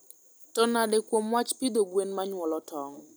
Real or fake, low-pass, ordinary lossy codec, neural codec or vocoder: real; none; none; none